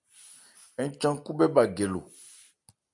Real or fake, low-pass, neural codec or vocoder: real; 10.8 kHz; none